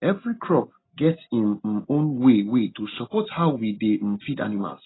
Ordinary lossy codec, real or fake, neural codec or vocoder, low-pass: AAC, 16 kbps; real; none; 7.2 kHz